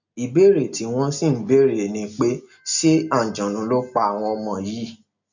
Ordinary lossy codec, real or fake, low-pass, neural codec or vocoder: none; real; 7.2 kHz; none